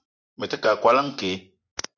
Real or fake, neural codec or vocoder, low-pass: fake; vocoder, 24 kHz, 100 mel bands, Vocos; 7.2 kHz